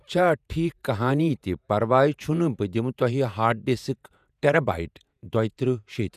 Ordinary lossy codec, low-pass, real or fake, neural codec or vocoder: none; 14.4 kHz; fake; vocoder, 48 kHz, 128 mel bands, Vocos